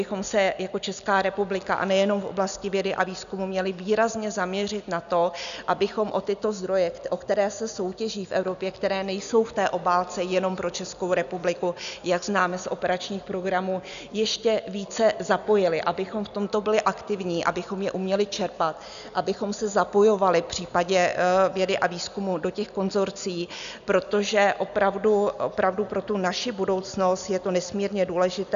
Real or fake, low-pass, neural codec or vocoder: real; 7.2 kHz; none